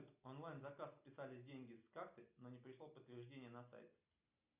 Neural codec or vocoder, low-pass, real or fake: none; 3.6 kHz; real